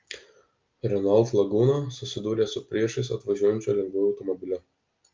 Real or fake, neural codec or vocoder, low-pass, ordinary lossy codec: real; none; 7.2 kHz; Opus, 24 kbps